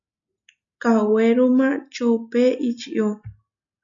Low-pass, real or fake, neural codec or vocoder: 7.2 kHz; real; none